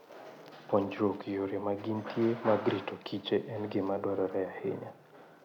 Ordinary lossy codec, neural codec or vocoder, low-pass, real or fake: none; none; 19.8 kHz; real